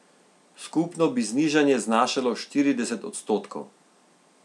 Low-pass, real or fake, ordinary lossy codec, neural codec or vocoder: none; real; none; none